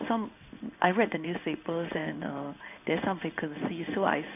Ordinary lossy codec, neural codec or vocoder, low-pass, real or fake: none; codec, 16 kHz in and 24 kHz out, 1 kbps, XY-Tokenizer; 3.6 kHz; fake